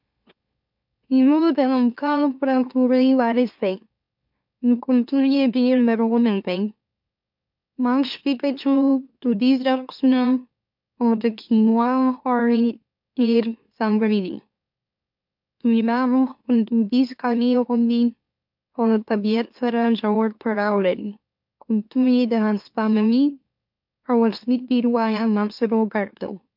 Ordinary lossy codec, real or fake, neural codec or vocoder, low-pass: MP3, 48 kbps; fake; autoencoder, 44.1 kHz, a latent of 192 numbers a frame, MeloTTS; 5.4 kHz